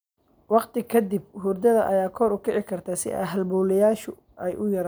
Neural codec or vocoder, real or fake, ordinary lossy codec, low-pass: none; real; none; none